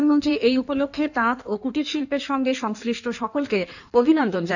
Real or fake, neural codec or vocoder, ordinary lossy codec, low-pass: fake; codec, 16 kHz in and 24 kHz out, 1.1 kbps, FireRedTTS-2 codec; none; 7.2 kHz